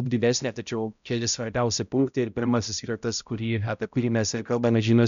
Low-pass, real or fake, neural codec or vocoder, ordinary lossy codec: 7.2 kHz; fake; codec, 16 kHz, 0.5 kbps, X-Codec, HuBERT features, trained on balanced general audio; MP3, 96 kbps